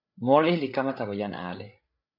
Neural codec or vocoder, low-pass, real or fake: codec, 16 kHz, 8 kbps, FreqCodec, larger model; 5.4 kHz; fake